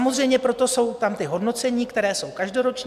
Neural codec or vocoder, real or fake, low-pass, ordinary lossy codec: none; real; 14.4 kHz; MP3, 96 kbps